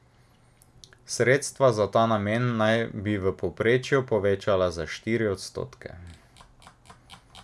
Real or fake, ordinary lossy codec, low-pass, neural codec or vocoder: real; none; none; none